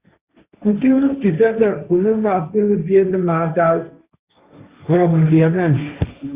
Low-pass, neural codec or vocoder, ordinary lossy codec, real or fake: 3.6 kHz; codec, 16 kHz, 1.1 kbps, Voila-Tokenizer; Opus, 64 kbps; fake